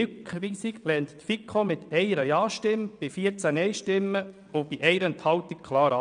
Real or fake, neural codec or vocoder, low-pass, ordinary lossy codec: fake; vocoder, 22.05 kHz, 80 mel bands, WaveNeXt; 9.9 kHz; none